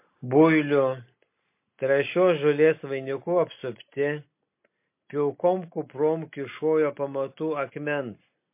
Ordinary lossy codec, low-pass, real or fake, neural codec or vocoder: MP3, 24 kbps; 3.6 kHz; real; none